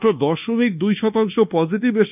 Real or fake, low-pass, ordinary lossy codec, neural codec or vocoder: fake; 3.6 kHz; none; codec, 24 kHz, 1.2 kbps, DualCodec